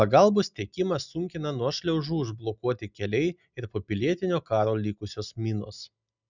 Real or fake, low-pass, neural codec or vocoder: real; 7.2 kHz; none